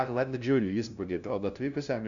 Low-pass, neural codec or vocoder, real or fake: 7.2 kHz; codec, 16 kHz, 0.5 kbps, FunCodec, trained on LibriTTS, 25 frames a second; fake